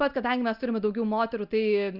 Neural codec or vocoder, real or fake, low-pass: none; real; 5.4 kHz